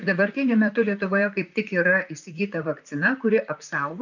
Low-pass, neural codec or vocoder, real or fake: 7.2 kHz; vocoder, 44.1 kHz, 128 mel bands, Pupu-Vocoder; fake